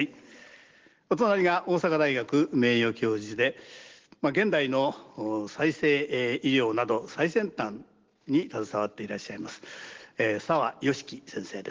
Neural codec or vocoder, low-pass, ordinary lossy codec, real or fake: none; 7.2 kHz; Opus, 16 kbps; real